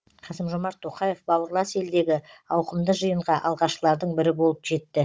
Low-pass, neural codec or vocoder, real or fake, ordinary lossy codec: none; codec, 16 kHz, 16 kbps, FunCodec, trained on Chinese and English, 50 frames a second; fake; none